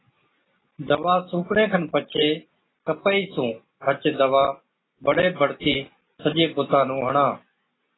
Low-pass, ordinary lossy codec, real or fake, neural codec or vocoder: 7.2 kHz; AAC, 16 kbps; real; none